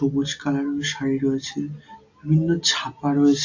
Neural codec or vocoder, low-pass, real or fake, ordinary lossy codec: none; 7.2 kHz; real; AAC, 48 kbps